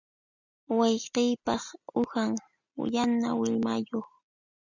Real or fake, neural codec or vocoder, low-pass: real; none; 7.2 kHz